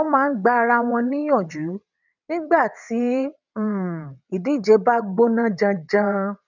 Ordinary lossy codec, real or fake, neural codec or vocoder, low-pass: none; fake; vocoder, 22.05 kHz, 80 mel bands, WaveNeXt; 7.2 kHz